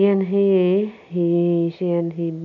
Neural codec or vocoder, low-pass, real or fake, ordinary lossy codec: none; 7.2 kHz; real; none